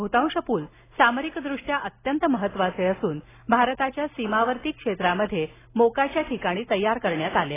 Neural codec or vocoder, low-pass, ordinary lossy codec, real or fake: none; 3.6 kHz; AAC, 16 kbps; real